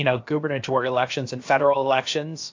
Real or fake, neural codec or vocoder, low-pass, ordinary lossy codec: fake; codec, 16 kHz, about 1 kbps, DyCAST, with the encoder's durations; 7.2 kHz; AAC, 48 kbps